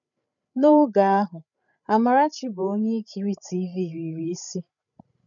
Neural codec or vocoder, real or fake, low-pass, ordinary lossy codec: codec, 16 kHz, 8 kbps, FreqCodec, larger model; fake; 7.2 kHz; none